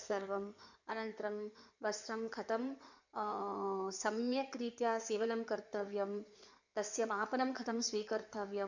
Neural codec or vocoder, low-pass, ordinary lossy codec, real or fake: codec, 16 kHz in and 24 kHz out, 2.2 kbps, FireRedTTS-2 codec; 7.2 kHz; none; fake